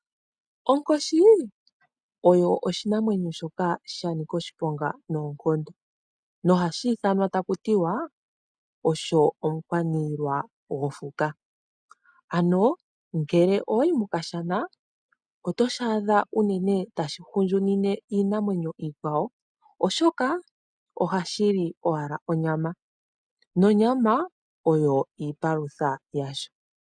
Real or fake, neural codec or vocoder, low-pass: real; none; 9.9 kHz